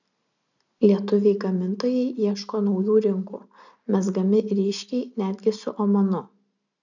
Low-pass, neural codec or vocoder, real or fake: 7.2 kHz; none; real